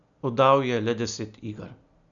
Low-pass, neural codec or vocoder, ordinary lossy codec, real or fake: 7.2 kHz; none; none; real